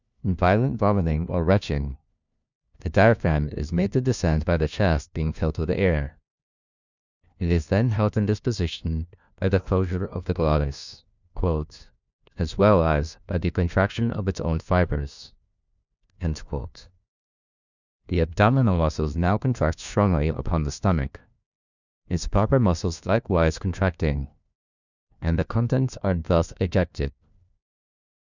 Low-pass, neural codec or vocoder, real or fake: 7.2 kHz; codec, 16 kHz, 1 kbps, FunCodec, trained on LibriTTS, 50 frames a second; fake